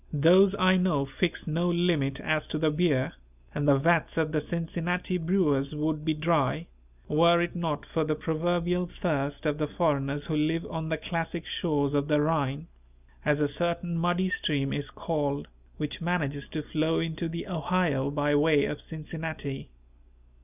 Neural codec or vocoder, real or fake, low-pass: none; real; 3.6 kHz